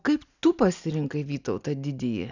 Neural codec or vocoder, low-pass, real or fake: none; 7.2 kHz; real